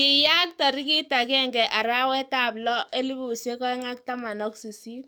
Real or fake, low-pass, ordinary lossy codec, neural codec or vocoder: fake; none; none; codec, 44.1 kHz, 7.8 kbps, DAC